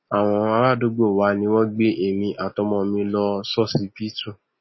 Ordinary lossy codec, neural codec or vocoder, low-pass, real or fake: MP3, 24 kbps; none; 7.2 kHz; real